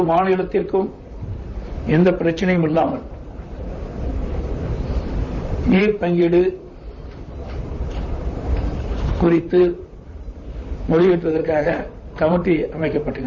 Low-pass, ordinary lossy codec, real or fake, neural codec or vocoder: 7.2 kHz; none; fake; vocoder, 44.1 kHz, 128 mel bands, Pupu-Vocoder